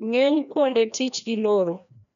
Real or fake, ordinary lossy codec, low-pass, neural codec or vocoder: fake; none; 7.2 kHz; codec, 16 kHz, 1 kbps, FreqCodec, larger model